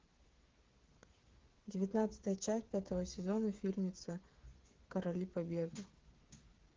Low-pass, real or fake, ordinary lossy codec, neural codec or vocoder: 7.2 kHz; fake; Opus, 16 kbps; codec, 16 kHz, 8 kbps, FreqCodec, smaller model